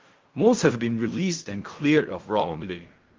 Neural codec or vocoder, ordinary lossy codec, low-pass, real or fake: codec, 16 kHz in and 24 kHz out, 0.4 kbps, LongCat-Audio-Codec, fine tuned four codebook decoder; Opus, 32 kbps; 7.2 kHz; fake